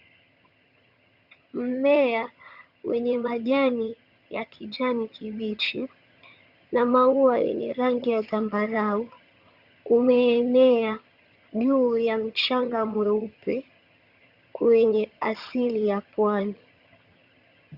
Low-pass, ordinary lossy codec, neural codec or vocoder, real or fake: 5.4 kHz; Opus, 64 kbps; vocoder, 22.05 kHz, 80 mel bands, HiFi-GAN; fake